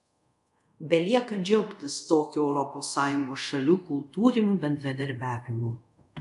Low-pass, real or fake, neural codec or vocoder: 10.8 kHz; fake; codec, 24 kHz, 0.5 kbps, DualCodec